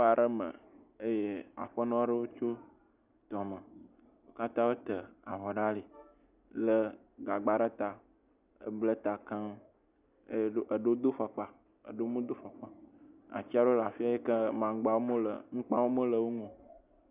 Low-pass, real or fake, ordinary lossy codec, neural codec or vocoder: 3.6 kHz; real; Opus, 64 kbps; none